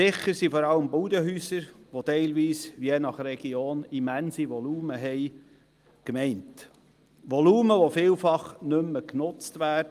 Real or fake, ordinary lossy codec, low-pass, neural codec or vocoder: real; Opus, 32 kbps; 14.4 kHz; none